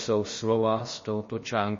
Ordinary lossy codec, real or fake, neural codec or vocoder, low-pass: MP3, 32 kbps; fake; codec, 16 kHz, about 1 kbps, DyCAST, with the encoder's durations; 7.2 kHz